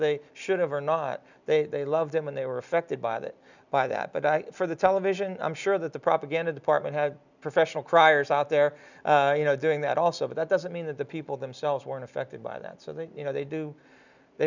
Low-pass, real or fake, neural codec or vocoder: 7.2 kHz; real; none